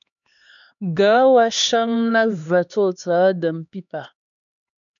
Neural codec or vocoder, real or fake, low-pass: codec, 16 kHz, 2 kbps, X-Codec, HuBERT features, trained on LibriSpeech; fake; 7.2 kHz